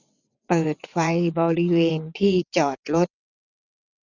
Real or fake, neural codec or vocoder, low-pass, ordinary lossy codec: fake; vocoder, 22.05 kHz, 80 mel bands, WaveNeXt; 7.2 kHz; none